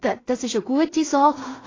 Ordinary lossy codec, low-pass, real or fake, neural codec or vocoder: AAC, 32 kbps; 7.2 kHz; fake; codec, 16 kHz in and 24 kHz out, 0.4 kbps, LongCat-Audio-Codec, two codebook decoder